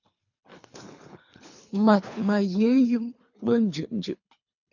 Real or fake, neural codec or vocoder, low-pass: fake; codec, 24 kHz, 3 kbps, HILCodec; 7.2 kHz